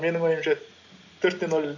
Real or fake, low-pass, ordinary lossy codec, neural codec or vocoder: real; 7.2 kHz; none; none